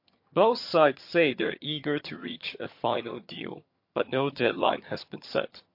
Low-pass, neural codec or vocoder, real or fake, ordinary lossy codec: 5.4 kHz; vocoder, 22.05 kHz, 80 mel bands, HiFi-GAN; fake; MP3, 32 kbps